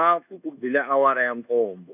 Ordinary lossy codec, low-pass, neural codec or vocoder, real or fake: none; 3.6 kHz; codec, 24 kHz, 1.2 kbps, DualCodec; fake